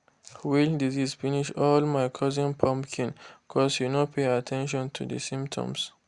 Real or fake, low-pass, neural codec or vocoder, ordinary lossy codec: real; 10.8 kHz; none; Opus, 64 kbps